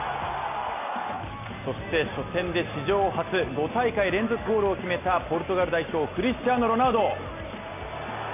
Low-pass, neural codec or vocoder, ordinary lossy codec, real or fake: 3.6 kHz; none; none; real